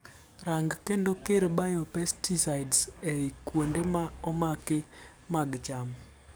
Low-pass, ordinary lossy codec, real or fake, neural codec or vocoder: none; none; fake; codec, 44.1 kHz, 7.8 kbps, DAC